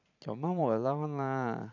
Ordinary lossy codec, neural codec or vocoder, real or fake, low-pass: none; vocoder, 44.1 kHz, 128 mel bands every 512 samples, BigVGAN v2; fake; 7.2 kHz